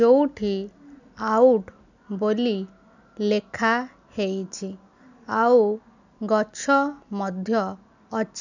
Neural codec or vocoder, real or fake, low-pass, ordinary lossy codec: none; real; 7.2 kHz; none